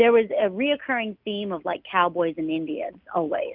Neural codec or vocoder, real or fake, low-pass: none; real; 5.4 kHz